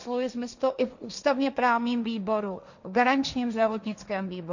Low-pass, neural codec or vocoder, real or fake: 7.2 kHz; codec, 16 kHz, 1.1 kbps, Voila-Tokenizer; fake